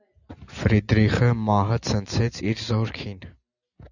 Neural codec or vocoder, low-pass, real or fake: none; 7.2 kHz; real